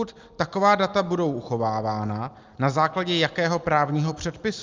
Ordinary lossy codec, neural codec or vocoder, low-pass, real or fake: Opus, 24 kbps; none; 7.2 kHz; real